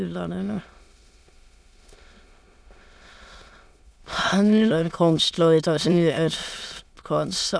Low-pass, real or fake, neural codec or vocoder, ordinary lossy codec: none; fake; autoencoder, 22.05 kHz, a latent of 192 numbers a frame, VITS, trained on many speakers; none